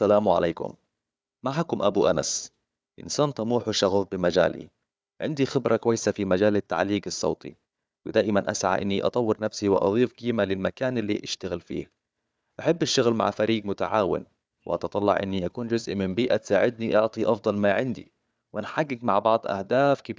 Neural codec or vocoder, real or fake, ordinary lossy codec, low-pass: codec, 16 kHz, 4 kbps, FunCodec, trained on Chinese and English, 50 frames a second; fake; none; none